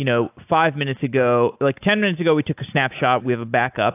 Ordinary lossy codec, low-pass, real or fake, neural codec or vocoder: AAC, 32 kbps; 3.6 kHz; real; none